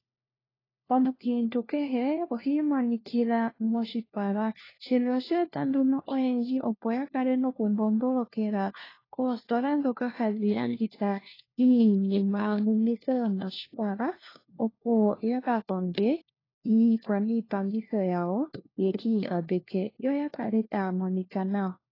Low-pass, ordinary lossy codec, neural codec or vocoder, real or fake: 5.4 kHz; AAC, 24 kbps; codec, 16 kHz, 1 kbps, FunCodec, trained on LibriTTS, 50 frames a second; fake